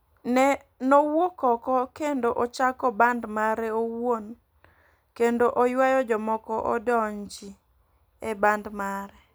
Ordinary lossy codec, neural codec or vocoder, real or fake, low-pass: none; none; real; none